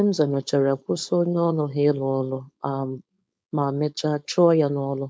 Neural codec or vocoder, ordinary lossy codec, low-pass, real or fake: codec, 16 kHz, 4.8 kbps, FACodec; none; none; fake